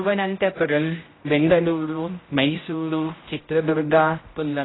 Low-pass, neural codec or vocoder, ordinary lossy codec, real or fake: 7.2 kHz; codec, 16 kHz, 0.5 kbps, X-Codec, HuBERT features, trained on general audio; AAC, 16 kbps; fake